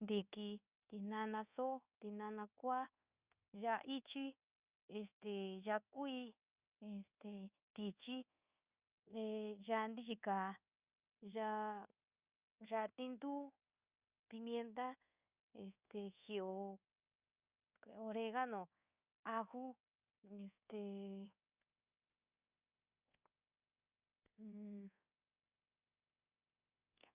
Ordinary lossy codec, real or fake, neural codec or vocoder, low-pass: Opus, 64 kbps; fake; codec, 24 kHz, 1.2 kbps, DualCodec; 3.6 kHz